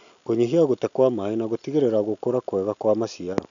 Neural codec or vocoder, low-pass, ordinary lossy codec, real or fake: none; 7.2 kHz; none; real